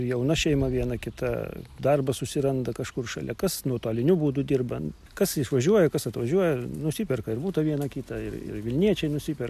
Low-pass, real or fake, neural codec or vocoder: 14.4 kHz; real; none